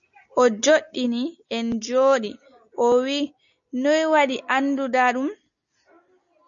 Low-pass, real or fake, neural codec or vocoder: 7.2 kHz; real; none